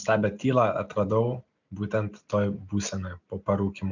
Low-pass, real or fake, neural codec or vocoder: 7.2 kHz; real; none